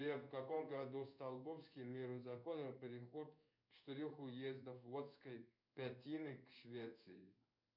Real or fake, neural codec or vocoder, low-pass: fake; codec, 16 kHz in and 24 kHz out, 1 kbps, XY-Tokenizer; 5.4 kHz